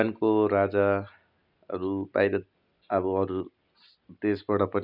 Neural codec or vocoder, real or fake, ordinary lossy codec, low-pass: none; real; none; 5.4 kHz